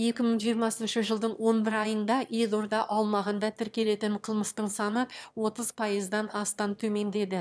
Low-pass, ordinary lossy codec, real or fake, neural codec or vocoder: none; none; fake; autoencoder, 22.05 kHz, a latent of 192 numbers a frame, VITS, trained on one speaker